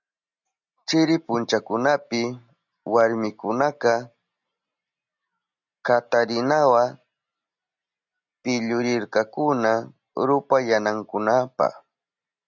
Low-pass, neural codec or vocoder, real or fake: 7.2 kHz; none; real